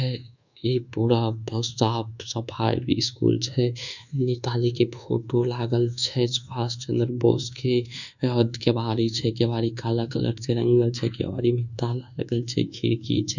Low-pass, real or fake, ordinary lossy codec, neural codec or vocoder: 7.2 kHz; fake; none; codec, 24 kHz, 1.2 kbps, DualCodec